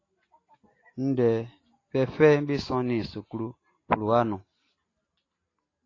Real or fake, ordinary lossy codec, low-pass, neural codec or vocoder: real; AAC, 32 kbps; 7.2 kHz; none